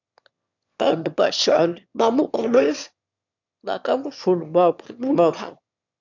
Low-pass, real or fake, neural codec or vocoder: 7.2 kHz; fake; autoencoder, 22.05 kHz, a latent of 192 numbers a frame, VITS, trained on one speaker